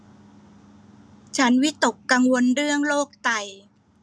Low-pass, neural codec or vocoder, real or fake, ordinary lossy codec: none; none; real; none